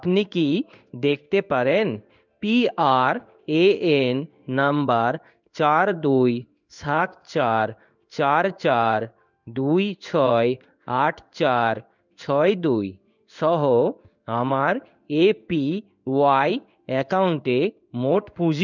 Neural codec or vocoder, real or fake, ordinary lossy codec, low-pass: codec, 16 kHz in and 24 kHz out, 1 kbps, XY-Tokenizer; fake; none; 7.2 kHz